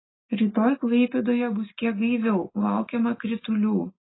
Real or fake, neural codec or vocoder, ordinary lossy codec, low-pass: real; none; AAC, 16 kbps; 7.2 kHz